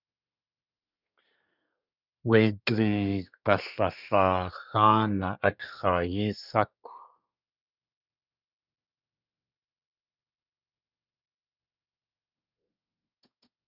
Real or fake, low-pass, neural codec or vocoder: fake; 5.4 kHz; codec, 24 kHz, 1 kbps, SNAC